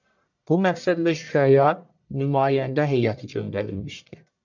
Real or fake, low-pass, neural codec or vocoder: fake; 7.2 kHz; codec, 44.1 kHz, 1.7 kbps, Pupu-Codec